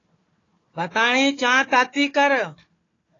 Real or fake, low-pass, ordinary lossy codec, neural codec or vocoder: fake; 7.2 kHz; AAC, 32 kbps; codec, 16 kHz, 4 kbps, FunCodec, trained on Chinese and English, 50 frames a second